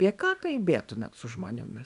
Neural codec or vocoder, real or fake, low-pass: codec, 24 kHz, 0.9 kbps, WavTokenizer, small release; fake; 10.8 kHz